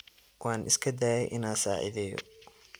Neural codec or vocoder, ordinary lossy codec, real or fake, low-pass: none; none; real; none